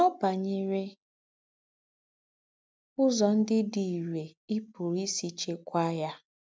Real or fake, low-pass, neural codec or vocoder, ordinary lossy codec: real; none; none; none